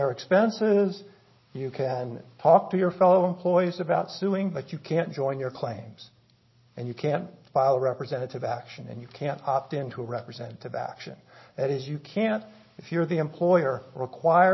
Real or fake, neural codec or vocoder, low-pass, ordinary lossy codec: real; none; 7.2 kHz; MP3, 24 kbps